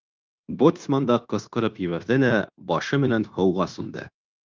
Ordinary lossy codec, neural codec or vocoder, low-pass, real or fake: Opus, 32 kbps; codec, 16 kHz, 0.9 kbps, LongCat-Audio-Codec; 7.2 kHz; fake